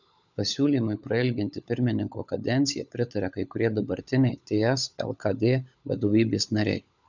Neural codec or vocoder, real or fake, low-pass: codec, 16 kHz, 16 kbps, FunCodec, trained on LibriTTS, 50 frames a second; fake; 7.2 kHz